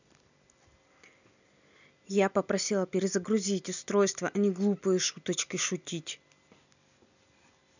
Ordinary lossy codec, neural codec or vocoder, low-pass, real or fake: none; none; 7.2 kHz; real